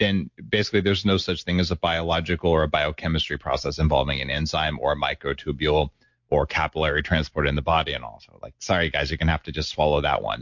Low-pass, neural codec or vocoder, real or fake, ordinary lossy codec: 7.2 kHz; none; real; MP3, 48 kbps